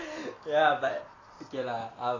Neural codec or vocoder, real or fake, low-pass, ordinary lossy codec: none; real; 7.2 kHz; AAC, 32 kbps